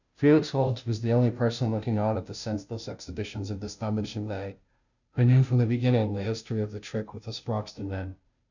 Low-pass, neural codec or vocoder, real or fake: 7.2 kHz; codec, 16 kHz, 0.5 kbps, FunCodec, trained on Chinese and English, 25 frames a second; fake